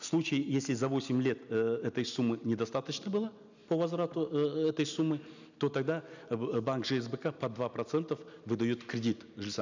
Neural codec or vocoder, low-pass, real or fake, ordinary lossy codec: none; 7.2 kHz; real; none